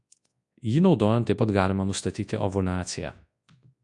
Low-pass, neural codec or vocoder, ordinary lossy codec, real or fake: 10.8 kHz; codec, 24 kHz, 0.9 kbps, WavTokenizer, large speech release; AAC, 64 kbps; fake